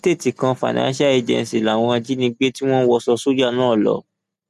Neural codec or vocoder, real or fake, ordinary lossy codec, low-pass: none; real; none; 14.4 kHz